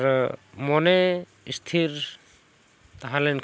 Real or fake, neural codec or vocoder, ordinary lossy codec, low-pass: real; none; none; none